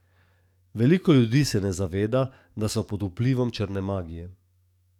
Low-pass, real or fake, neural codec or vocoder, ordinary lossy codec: 19.8 kHz; fake; codec, 44.1 kHz, 7.8 kbps, DAC; none